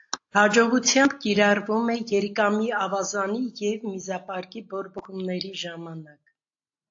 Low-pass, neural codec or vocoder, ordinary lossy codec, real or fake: 7.2 kHz; none; AAC, 48 kbps; real